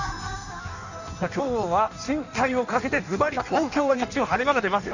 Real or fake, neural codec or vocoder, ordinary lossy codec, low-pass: fake; codec, 16 kHz in and 24 kHz out, 1.1 kbps, FireRedTTS-2 codec; none; 7.2 kHz